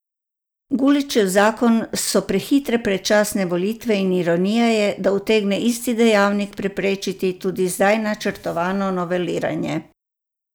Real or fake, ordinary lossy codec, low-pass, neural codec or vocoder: real; none; none; none